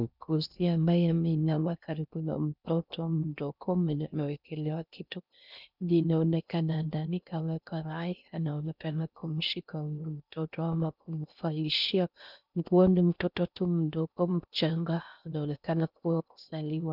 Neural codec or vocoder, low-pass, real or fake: codec, 16 kHz in and 24 kHz out, 0.6 kbps, FocalCodec, streaming, 2048 codes; 5.4 kHz; fake